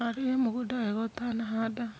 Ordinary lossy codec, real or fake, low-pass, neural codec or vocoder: none; real; none; none